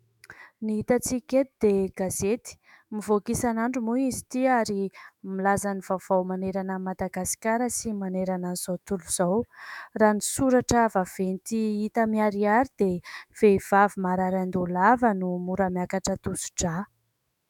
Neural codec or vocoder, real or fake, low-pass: autoencoder, 48 kHz, 128 numbers a frame, DAC-VAE, trained on Japanese speech; fake; 19.8 kHz